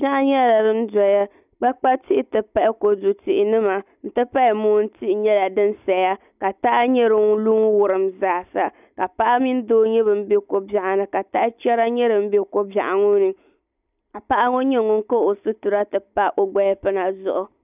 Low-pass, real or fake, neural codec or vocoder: 3.6 kHz; real; none